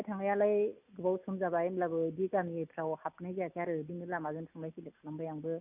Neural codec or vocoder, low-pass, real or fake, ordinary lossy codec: none; 3.6 kHz; real; none